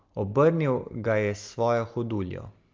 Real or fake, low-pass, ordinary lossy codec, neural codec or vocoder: real; 7.2 kHz; Opus, 32 kbps; none